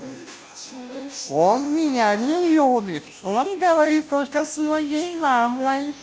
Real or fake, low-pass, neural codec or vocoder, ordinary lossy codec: fake; none; codec, 16 kHz, 0.5 kbps, FunCodec, trained on Chinese and English, 25 frames a second; none